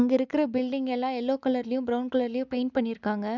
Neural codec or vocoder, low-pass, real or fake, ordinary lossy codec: none; 7.2 kHz; real; none